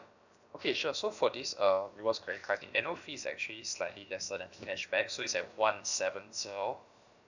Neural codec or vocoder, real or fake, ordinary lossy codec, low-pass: codec, 16 kHz, about 1 kbps, DyCAST, with the encoder's durations; fake; none; 7.2 kHz